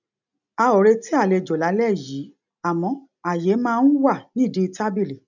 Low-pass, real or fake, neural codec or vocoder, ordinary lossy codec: 7.2 kHz; real; none; none